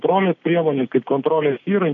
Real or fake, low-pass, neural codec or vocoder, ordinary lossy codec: real; 7.2 kHz; none; AAC, 64 kbps